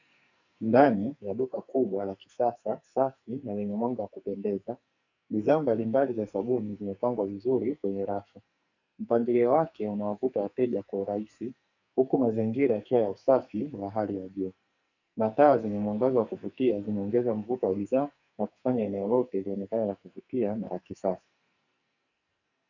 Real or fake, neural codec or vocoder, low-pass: fake; codec, 44.1 kHz, 2.6 kbps, SNAC; 7.2 kHz